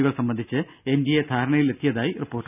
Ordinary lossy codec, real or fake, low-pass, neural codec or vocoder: none; real; 3.6 kHz; none